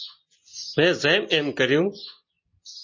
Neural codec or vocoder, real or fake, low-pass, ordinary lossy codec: none; real; 7.2 kHz; MP3, 32 kbps